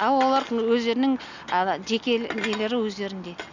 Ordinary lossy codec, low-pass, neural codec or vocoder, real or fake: none; 7.2 kHz; none; real